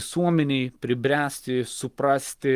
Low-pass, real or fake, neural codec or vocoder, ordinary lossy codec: 14.4 kHz; real; none; Opus, 24 kbps